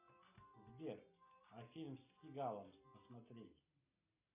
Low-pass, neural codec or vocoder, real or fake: 3.6 kHz; none; real